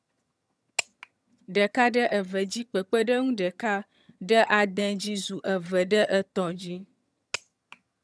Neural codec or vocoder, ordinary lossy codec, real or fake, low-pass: vocoder, 22.05 kHz, 80 mel bands, HiFi-GAN; none; fake; none